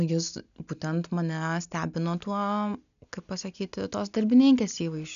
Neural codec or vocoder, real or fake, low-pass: none; real; 7.2 kHz